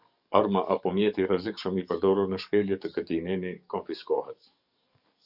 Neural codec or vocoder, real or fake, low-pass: codec, 44.1 kHz, 7.8 kbps, DAC; fake; 5.4 kHz